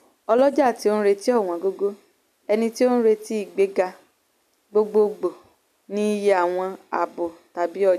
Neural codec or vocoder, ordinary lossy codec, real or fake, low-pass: none; none; real; 14.4 kHz